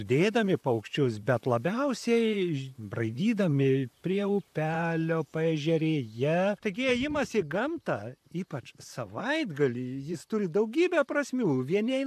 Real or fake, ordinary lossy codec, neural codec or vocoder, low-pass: fake; AAC, 96 kbps; vocoder, 44.1 kHz, 128 mel bands, Pupu-Vocoder; 14.4 kHz